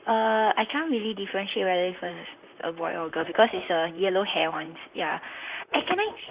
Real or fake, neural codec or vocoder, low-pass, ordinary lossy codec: fake; vocoder, 44.1 kHz, 128 mel bands, Pupu-Vocoder; 3.6 kHz; Opus, 64 kbps